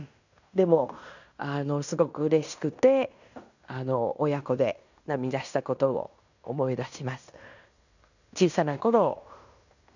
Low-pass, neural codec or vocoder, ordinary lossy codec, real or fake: 7.2 kHz; codec, 16 kHz in and 24 kHz out, 0.9 kbps, LongCat-Audio-Codec, fine tuned four codebook decoder; none; fake